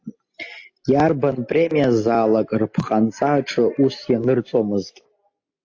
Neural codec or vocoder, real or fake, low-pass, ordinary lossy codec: none; real; 7.2 kHz; AAC, 48 kbps